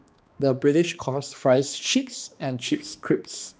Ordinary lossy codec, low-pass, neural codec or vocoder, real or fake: none; none; codec, 16 kHz, 2 kbps, X-Codec, HuBERT features, trained on balanced general audio; fake